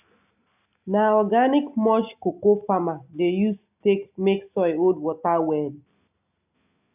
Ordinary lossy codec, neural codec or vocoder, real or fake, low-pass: none; none; real; 3.6 kHz